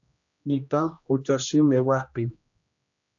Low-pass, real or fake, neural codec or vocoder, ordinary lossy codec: 7.2 kHz; fake; codec, 16 kHz, 1 kbps, X-Codec, HuBERT features, trained on general audio; AAC, 64 kbps